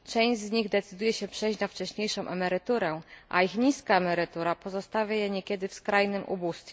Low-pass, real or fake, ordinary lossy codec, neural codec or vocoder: none; real; none; none